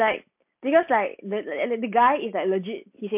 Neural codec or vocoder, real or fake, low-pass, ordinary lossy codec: none; real; 3.6 kHz; MP3, 32 kbps